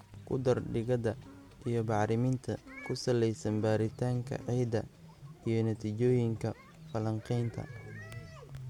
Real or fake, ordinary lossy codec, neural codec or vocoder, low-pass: real; MP3, 96 kbps; none; 19.8 kHz